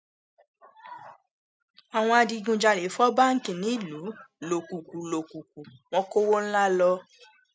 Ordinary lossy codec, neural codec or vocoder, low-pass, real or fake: none; none; none; real